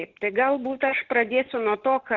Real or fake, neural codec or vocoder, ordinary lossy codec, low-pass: real; none; Opus, 16 kbps; 7.2 kHz